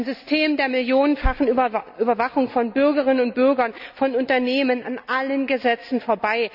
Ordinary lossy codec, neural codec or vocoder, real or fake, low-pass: none; none; real; 5.4 kHz